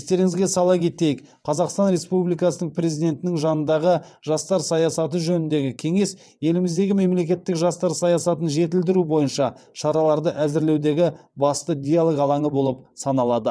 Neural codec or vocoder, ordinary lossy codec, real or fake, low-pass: vocoder, 22.05 kHz, 80 mel bands, WaveNeXt; none; fake; none